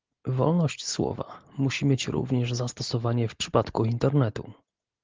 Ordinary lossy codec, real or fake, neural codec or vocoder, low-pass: Opus, 16 kbps; real; none; 7.2 kHz